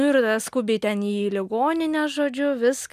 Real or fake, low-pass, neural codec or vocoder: real; 14.4 kHz; none